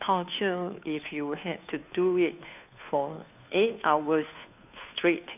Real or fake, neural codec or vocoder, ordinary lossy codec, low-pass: fake; codec, 16 kHz, 2 kbps, FunCodec, trained on Chinese and English, 25 frames a second; none; 3.6 kHz